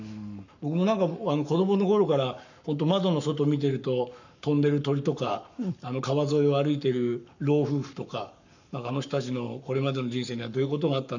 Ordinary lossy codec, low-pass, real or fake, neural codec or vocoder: none; 7.2 kHz; fake; codec, 44.1 kHz, 7.8 kbps, Pupu-Codec